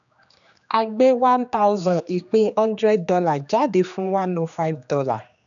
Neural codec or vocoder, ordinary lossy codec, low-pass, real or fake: codec, 16 kHz, 2 kbps, X-Codec, HuBERT features, trained on general audio; none; 7.2 kHz; fake